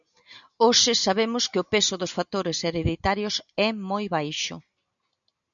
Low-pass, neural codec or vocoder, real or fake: 7.2 kHz; none; real